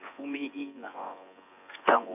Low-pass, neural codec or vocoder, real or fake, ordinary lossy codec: 3.6 kHz; vocoder, 24 kHz, 100 mel bands, Vocos; fake; none